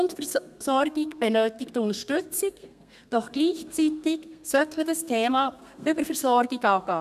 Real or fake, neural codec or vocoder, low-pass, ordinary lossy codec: fake; codec, 32 kHz, 1.9 kbps, SNAC; 14.4 kHz; none